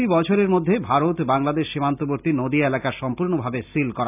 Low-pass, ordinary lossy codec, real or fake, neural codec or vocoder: 3.6 kHz; none; real; none